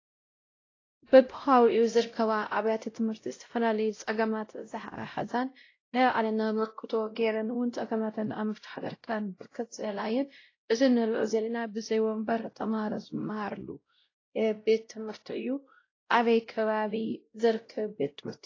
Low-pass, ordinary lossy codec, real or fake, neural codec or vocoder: 7.2 kHz; AAC, 32 kbps; fake; codec, 16 kHz, 0.5 kbps, X-Codec, WavLM features, trained on Multilingual LibriSpeech